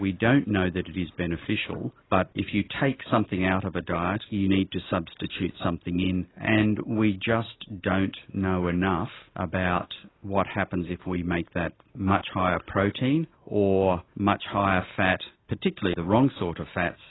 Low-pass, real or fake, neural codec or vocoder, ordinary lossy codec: 7.2 kHz; real; none; AAC, 16 kbps